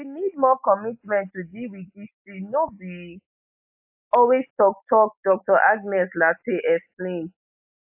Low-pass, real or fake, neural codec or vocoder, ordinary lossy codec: 3.6 kHz; real; none; AAC, 32 kbps